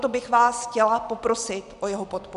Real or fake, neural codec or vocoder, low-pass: real; none; 10.8 kHz